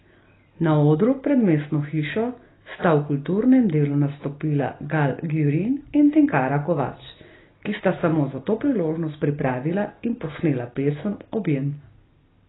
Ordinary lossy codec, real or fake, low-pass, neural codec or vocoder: AAC, 16 kbps; real; 7.2 kHz; none